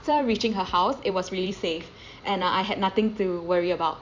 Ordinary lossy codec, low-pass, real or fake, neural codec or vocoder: MP3, 64 kbps; 7.2 kHz; fake; vocoder, 44.1 kHz, 128 mel bands every 256 samples, BigVGAN v2